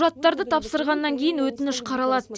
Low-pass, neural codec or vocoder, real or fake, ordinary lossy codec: none; none; real; none